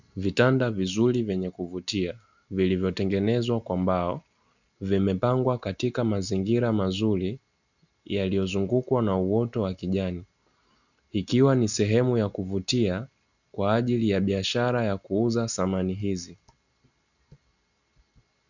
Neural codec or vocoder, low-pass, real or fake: none; 7.2 kHz; real